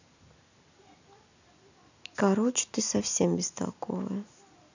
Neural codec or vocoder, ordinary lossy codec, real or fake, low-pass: none; none; real; 7.2 kHz